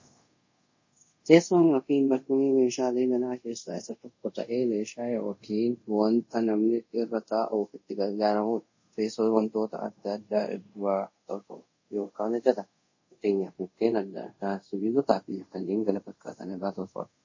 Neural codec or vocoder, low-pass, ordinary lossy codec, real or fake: codec, 24 kHz, 0.5 kbps, DualCodec; 7.2 kHz; MP3, 32 kbps; fake